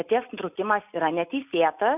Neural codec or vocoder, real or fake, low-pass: none; real; 3.6 kHz